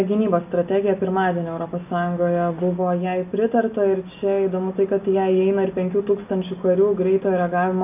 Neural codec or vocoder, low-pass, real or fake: none; 3.6 kHz; real